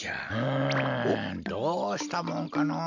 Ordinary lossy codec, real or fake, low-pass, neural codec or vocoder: none; real; 7.2 kHz; none